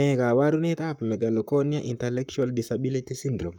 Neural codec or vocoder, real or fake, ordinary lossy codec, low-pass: codec, 44.1 kHz, 7.8 kbps, Pupu-Codec; fake; none; 19.8 kHz